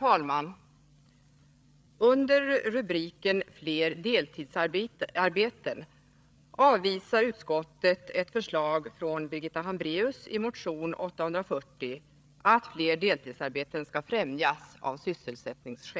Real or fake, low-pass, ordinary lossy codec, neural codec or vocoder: fake; none; none; codec, 16 kHz, 8 kbps, FreqCodec, larger model